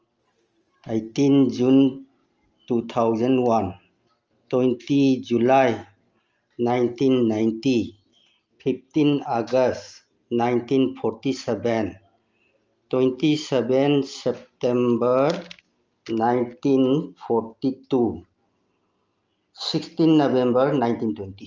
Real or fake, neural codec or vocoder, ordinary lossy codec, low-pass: real; none; Opus, 24 kbps; 7.2 kHz